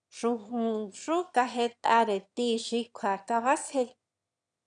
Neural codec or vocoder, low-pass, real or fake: autoencoder, 22.05 kHz, a latent of 192 numbers a frame, VITS, trained on one speaker; 9.9 kHz; fake